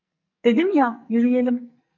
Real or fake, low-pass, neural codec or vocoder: fake; 7.2 kHz; codec, 44.1 kHz, 2.6 kbps, SNAC